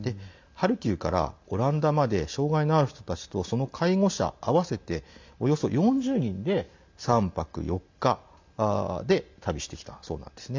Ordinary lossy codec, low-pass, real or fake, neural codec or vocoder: MP3, 64 kbps; 7.2 kHz; real; none